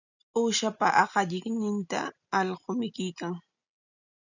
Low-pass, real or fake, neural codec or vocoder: 7.2 kHz; real; none